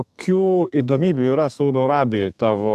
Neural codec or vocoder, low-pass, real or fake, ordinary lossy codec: codec, 32 kHz, 1.9 kbps, SNAC; 14.4 kHz; fake; AAC, 96 kbps